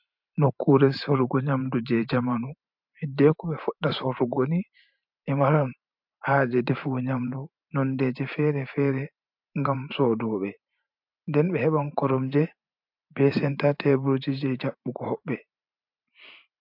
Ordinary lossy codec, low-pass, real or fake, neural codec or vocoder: MP3, 48 kbps; 5.4 kHz; fake; vocoder, 44.1 kHz, 128 mel bands every 512 samples, BigVGAN v2